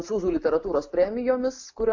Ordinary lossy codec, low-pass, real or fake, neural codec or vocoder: AAC, 48 kbps; 7.2 kHz; real; none